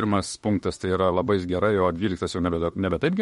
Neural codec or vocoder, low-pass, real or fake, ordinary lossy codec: autoencoder, 48 kHz, 32 numbers a frame, DAC-VAE, trained on Japanese speech; 19.8 kHz; fake; MP3, 48 kbps